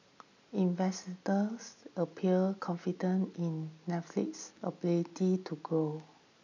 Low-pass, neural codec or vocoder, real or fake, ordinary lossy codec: 7.2 kHz; none; real; none